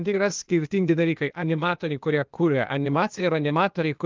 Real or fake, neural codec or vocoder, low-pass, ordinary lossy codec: fake; codec, 16 kHz, 0.8 kbps, ZipCodec; 7.2 kHz; Opus, 32 kbps